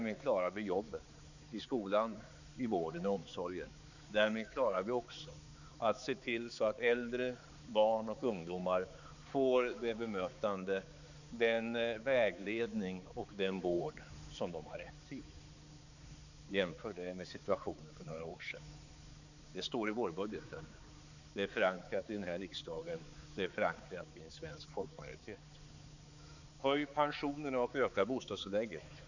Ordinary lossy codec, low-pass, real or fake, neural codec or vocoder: none; 7.2 kHz; fake; codec, 16 kHz, 4 kbps, X-Codec, HuBERT features, trained on balanced general audio